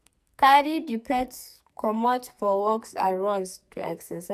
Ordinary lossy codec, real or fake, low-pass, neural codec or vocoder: none; fake; 14.4 kHz; codec, 44.1 kHz, 2.6 kbps, SNAC